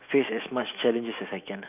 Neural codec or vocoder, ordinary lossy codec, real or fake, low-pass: autoencoder, 48 kHz, 128 numbers a frame, DAC-VAE, trained on Japanese speech; none; fake; 3.6 kHz